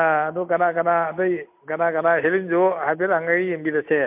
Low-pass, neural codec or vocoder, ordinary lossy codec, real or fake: 3.6 kHz; none; MP3, 32 kbps; real